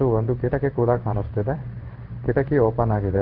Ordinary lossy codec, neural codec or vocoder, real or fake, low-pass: Opus, 16 kbps; codec, 16 kHz in and 24 kHz out, 1 kbps, XY-Tokenizer; fake; 5.4 kHz